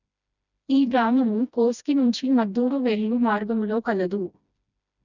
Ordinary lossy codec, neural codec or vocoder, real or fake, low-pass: none; codec, 16 kHz, 1 kbps, FreqCodec, smaller model; fake; 7.2 kHz